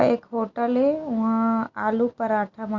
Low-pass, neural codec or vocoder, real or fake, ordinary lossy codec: 7.2 kHz; none; real; Opus, 64 kbps